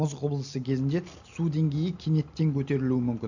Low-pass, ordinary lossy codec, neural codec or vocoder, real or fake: 7.2 kHz; none; none; real